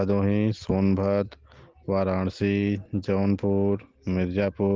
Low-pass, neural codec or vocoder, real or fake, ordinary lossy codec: 7.2 kHz; none; real; Opus, 16 kbps